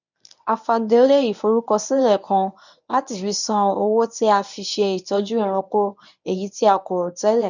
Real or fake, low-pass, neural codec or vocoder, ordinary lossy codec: fake; 7.2 kHz; codec, 24 kHz, 0.9 kbps, WavTokenizer, medium speech release version 1; none